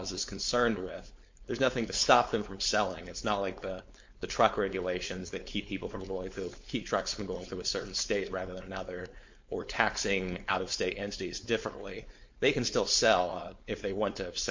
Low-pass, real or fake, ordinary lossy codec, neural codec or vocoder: 7.2 kHz; fake; MP3, 48 kbps; codec, 16 kHz, 4.8 kbps, FACodec